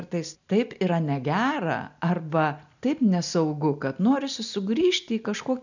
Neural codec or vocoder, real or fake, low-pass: none; real; 7.2 kHz